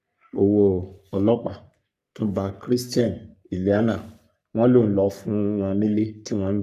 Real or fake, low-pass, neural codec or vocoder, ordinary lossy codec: fake; 14.4 kHz; codec, 44.1 kHz, 3.4 kbps, Pupu-Codec; none